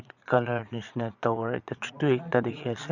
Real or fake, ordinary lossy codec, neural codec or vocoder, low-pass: fake; Opus, 64 kbps; vocoder, 22.05 kHz, 80 mel bands, Vocos; 7.2 kHz